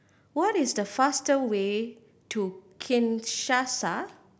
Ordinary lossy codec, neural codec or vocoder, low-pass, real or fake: none; none; none; real